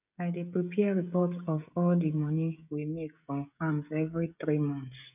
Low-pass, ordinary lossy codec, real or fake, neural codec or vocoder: 3.6 kHz; none; fake; codec, 16 kHz, 16 kbps, FreqCodec, smaller model